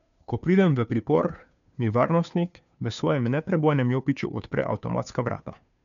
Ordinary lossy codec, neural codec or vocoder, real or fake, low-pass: none; codec, 16 kHz, 2 kbps, FunCodec, trained on Chinese and English, 25 frames a second; fake; 7.2 kHz